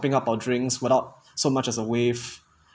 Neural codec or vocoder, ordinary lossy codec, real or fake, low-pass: none; none; real; none